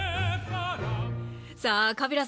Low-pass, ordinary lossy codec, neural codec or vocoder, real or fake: none; none; none; real